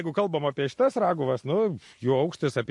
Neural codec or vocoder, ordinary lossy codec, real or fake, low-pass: none; MP3, 48 kbps; real; 10.8 kHz